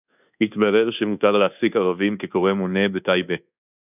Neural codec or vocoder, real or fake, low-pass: codec, 24 kHz, 1.2 kbps, DualCodec; fake; 3.6 kHz